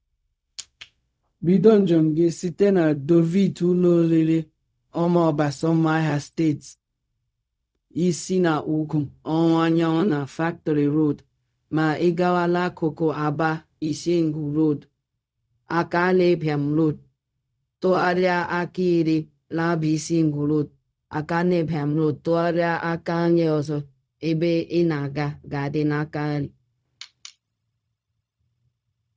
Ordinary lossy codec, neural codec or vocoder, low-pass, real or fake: none; codec, 16 kHz, 0.4 kbps, LongCat-Audio-Codec; none; fake